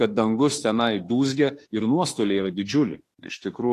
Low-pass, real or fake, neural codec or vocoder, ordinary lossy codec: 14.4 kHz; fake; autoencoder, 48 kHz, 32 numbers a frame, DAC-VAE, trained on Japanese speech; AAC, 48 kbps